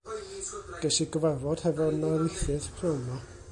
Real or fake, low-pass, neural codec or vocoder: real; 10.8 kHz; none